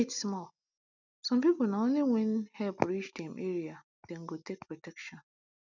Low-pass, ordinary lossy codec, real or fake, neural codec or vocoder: 7.2 kHz; none; real; none